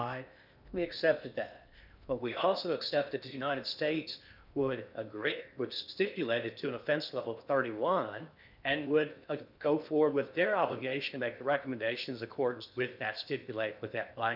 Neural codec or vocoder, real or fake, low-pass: codec, 16 kHz in and 24 kHz out, 0.8 kbps, FocalCodec, streaming, 65536 codes; fake; 5.4 kHz